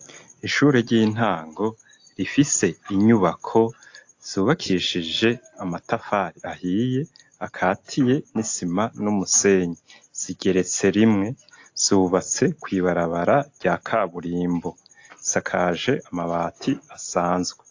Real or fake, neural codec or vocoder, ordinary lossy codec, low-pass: real; none; AAC, 48 kbps; 7.2 kHz